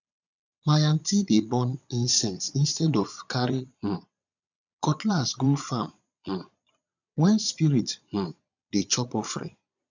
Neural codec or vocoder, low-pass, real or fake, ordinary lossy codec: vocoder, 22.05 kHz, 80 mel bands, Vocos; 7.2 kHz; fake; none